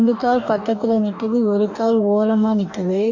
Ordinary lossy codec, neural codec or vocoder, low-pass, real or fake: none; codec, 44.1 kHz, 2.6 kbps, DAC; 7.2 kHz; fake